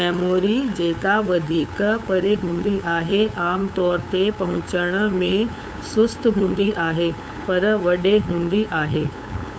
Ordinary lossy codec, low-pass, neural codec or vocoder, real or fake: none; none; codec, 16 kHz, 8 kbps, FunCodec, trained on LibriTTS, 25 frames a second; fake